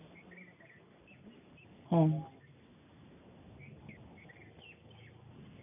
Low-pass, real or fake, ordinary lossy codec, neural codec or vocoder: 3.6 kHz; real; none; none